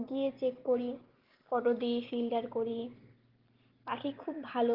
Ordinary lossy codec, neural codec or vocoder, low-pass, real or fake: Opus, 16 kbps; none; 5.4 kHz; real